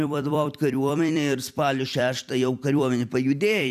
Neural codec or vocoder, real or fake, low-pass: vocoder, 44.1 kHz, 128 mel bands every 256 samples, BigVGAN v2; fake; 14.4 kHz